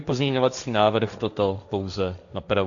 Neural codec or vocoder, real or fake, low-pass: codec, 16 kHz, 1.1 kbps, Voila-Tokenizer; fake; 7.2 kHz